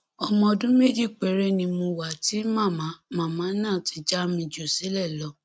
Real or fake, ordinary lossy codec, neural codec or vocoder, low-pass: real; none; none; none